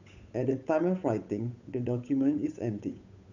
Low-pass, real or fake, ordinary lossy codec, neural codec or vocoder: 7.2 kHz; fake; none; codec, 16 kHz, 8 kbps, FunCodec, trained on Chinese and English, 25 frames a second